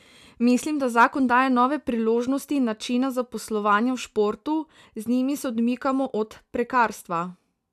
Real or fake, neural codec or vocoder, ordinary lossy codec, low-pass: real; none; none; 14.4 kHz